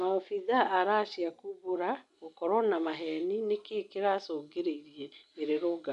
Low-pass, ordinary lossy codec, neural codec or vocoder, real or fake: 10.8 kHz; none; none; real